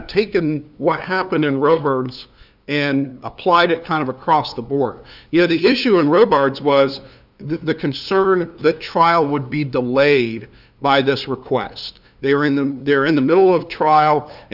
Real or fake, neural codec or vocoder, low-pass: fake; codec, 16 kHz, 2 kbps, FunCodec, trained on LibriTTS, 25 frames a second; 5.4 kHz